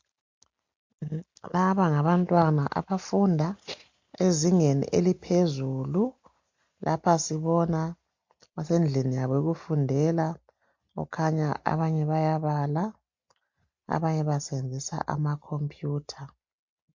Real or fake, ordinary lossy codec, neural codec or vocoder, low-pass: real; MP3, 48 kbps; none; 7.2 kHz